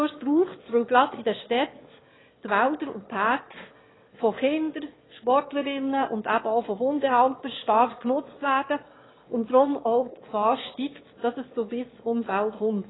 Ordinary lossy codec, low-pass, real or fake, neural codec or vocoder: AAC, 16 kbps; 7.2 kHz; fake; autoencoder, 22.05 kHz, a latent of 192 numbers a frame, VITS, trained on one speaker